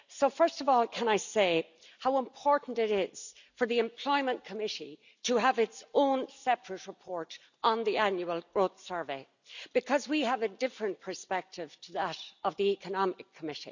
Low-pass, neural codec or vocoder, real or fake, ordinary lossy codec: 7.2 kHz; none; real; none